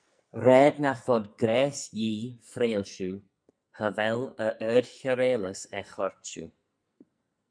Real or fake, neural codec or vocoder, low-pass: fake; codec, 44.1 kHz, 2.6 kbps, SNAC; 9.9 kHz